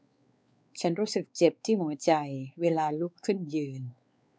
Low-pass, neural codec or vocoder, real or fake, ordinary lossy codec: none; codec, 16 kHz, 4 kbps, X-Codec, WavLM features, trained on Multilingual LibriSpeech; fake; none